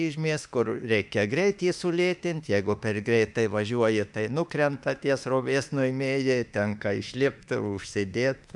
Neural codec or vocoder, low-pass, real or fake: autoencoder, 48 kHz, 32 numbers a frame, DAC-VAE, trained on Japanese speech; 10.8 kHz; fake